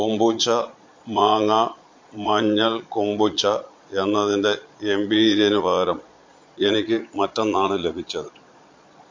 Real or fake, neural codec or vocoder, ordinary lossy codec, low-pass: fake; vocoder, 22.05 kHz, 80 mel bands, Vocos; MP3, 48 kbps; 7.2 kHz